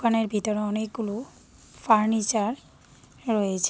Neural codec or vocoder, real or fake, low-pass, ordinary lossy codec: none; real; none; none